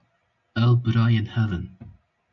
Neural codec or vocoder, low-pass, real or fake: none; 7.2 kHz; real